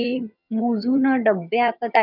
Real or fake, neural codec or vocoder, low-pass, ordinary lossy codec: fake; vocoder, 22.05 kHz, 80 mel bands, HiFi-GAN; 5.4 kHz; none